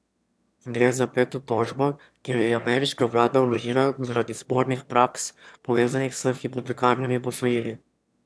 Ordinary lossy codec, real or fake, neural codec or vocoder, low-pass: none; fake; autoencoder, 22.05 kHz, a latent of 192 numbers a frame, VITS, trained on one speaker; none